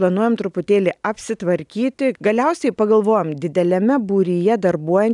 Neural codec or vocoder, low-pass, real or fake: none; 10.8 kHz; real